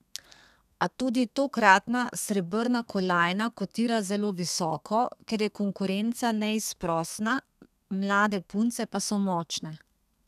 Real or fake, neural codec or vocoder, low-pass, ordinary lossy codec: fake; codec, 32 kHz, 1.9 kbps, SNAC; 14.4 kHz; none